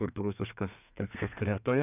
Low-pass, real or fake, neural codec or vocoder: 3.6 kHz; fake; codec, 32 kHz, 1.9 kbps, SNAC